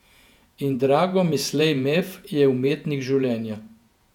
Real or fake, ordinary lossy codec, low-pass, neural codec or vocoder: fake; none; 19.8 kHz; vocoder, 48 kHz, 128 mel bands, Vocos